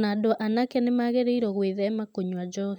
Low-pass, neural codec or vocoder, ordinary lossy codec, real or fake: 19.8 kHz; none; none; real